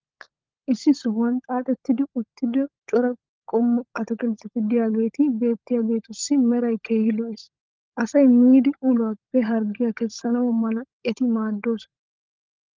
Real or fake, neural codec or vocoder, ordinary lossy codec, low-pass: fake; codec, 16 kHz, 16 kbps, FunCodec, trained on LibriTTS, 50 frames a second; Opus, 24 kbps; 7.2 kHz